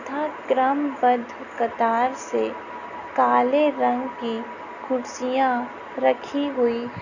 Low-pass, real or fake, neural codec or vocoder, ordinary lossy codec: 7.2 kHz; real; none; none